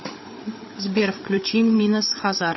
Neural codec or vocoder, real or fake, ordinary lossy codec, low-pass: codec, 16 kHz, 8 kbps, FreqCodec, larger model; fake; MP3, 24 kbps; 7.2 kHz